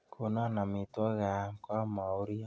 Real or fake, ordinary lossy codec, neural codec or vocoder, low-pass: real; none; none; none